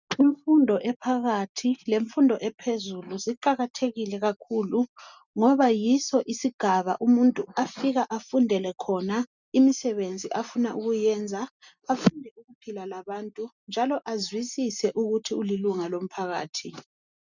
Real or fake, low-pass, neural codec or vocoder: real; 7.2 kHz; none